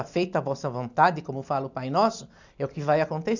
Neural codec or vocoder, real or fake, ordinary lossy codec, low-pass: none; real; none; 7.2 kHz